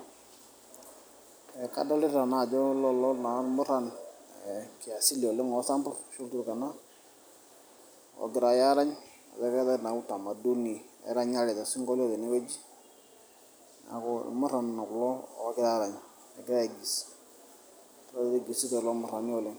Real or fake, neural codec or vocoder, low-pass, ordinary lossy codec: real; none; none; none